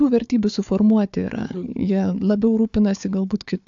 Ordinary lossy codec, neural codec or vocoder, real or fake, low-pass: AAC, 64 kbps; codec, 16 kHz, 4 kbps, FunCodec, trained on Chinese and English, 50 frames a second; fake; 7.2 kHz